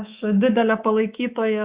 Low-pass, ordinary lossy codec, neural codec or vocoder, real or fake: 3.6 kHz; Opus, 64 kbps; codec, 24 kHz, 3.1 kbps, DualCodec; fake